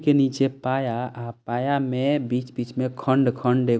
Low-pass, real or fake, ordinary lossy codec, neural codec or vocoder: none; real; none; none